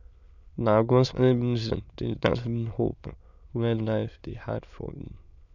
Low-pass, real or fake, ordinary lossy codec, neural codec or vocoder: 7.2 kHz; fake; none; autoencoder, 22.05 kHz, a latent of 192 numbers a frame, VITS, trained on many speakers